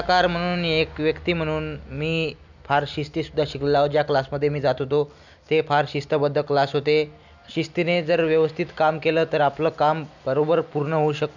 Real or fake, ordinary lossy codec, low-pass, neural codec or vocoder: real; none; 7.2 kHz; none